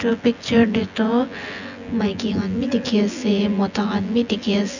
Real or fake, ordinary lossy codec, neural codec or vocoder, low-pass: fake; none; vocoder, 24 kHz, 100 mel bands, Vocos; 7.2 kHz